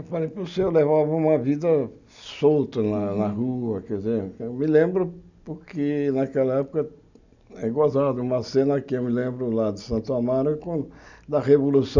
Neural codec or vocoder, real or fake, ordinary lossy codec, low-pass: none; real; none; 7.2 kHz